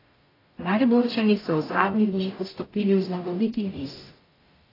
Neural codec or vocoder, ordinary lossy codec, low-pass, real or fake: codec, 44.1 kHz, 0.9 kbps, DAC; AAC, 24 kbps; 5.4 kHz; fake